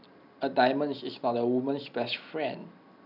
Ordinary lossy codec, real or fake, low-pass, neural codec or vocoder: none; real; 5.4 kHz; none